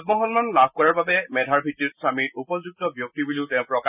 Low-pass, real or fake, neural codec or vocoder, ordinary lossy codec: 3.6 kHz; real; none; none